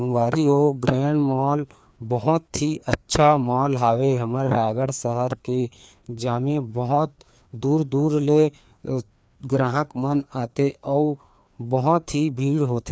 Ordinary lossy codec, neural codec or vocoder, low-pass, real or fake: none; codec, 16 kHz, 2 kbps, FreqCodec, larger model; none; fake